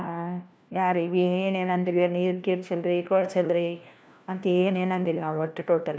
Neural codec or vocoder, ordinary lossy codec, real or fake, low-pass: codec, 16 kHz, 1 kbps, FunCodec, trained on LibriTTS, 50 frames a second; none; fake; none